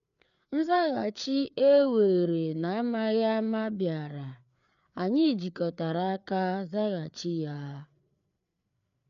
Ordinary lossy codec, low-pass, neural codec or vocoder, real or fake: none; 7.2 kHz; codec, 16 kHz, 4 kbps, FreqCodec, larger model; fake